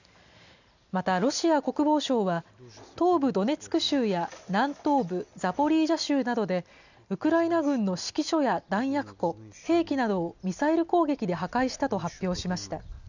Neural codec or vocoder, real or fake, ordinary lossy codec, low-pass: none; real; none; 7.2 kHz